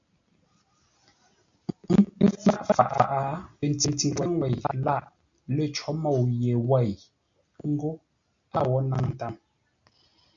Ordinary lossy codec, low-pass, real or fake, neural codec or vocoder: AAC, 64 kbps; 7.2 kHz; real; none